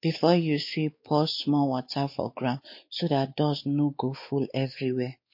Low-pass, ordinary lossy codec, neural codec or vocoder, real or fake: 5.4 kHz; MP3, 32 kbps; codec, 16 kHz, 4 kbps, X-Codec, WavLM features, trained on Multilingual LibriSpeech; fake